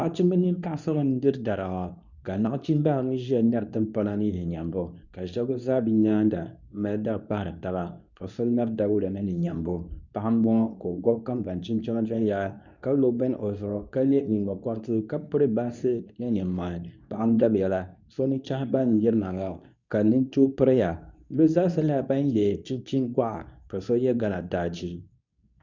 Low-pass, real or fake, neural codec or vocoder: 7.2 kHz; fake; codec, 24 kHz, 0.9 kbps, WavTokenizer, medium speech release version 2